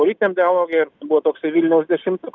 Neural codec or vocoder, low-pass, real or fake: none; 7.2 kHz; real